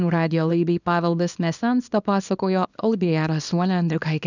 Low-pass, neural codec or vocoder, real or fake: 7.2 kHz; codec, 24 kHz, 0.9 kbps, WavTokenizer, small release; fake